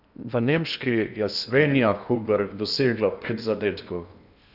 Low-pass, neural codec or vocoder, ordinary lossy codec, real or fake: 5.4 kHz; codec, 16 kHz in and 24 kHz out, 0.8 kbps, FocalCodec, streaming, 65536 codes; none; fake